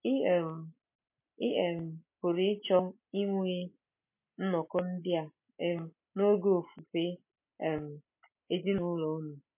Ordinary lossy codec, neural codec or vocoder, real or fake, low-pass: MP3, 24 kbps; none; real; 3.6 kHz